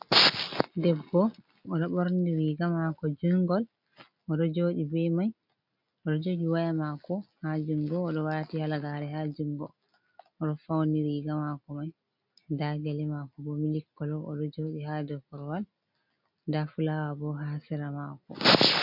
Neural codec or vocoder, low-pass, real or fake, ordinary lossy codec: none; 5.4 kHz; real; MP3, 48 kbps